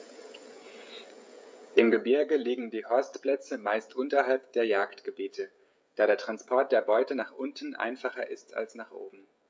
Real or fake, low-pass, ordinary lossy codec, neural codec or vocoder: fake; none; none; codec, 16 kHz, 16 kbps, FreqCodec, smaller model